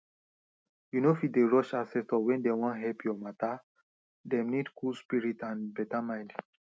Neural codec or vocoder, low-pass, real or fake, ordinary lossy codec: none; none; real; none